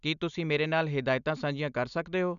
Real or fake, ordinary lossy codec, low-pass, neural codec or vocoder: real; none; 7.2 kHz; none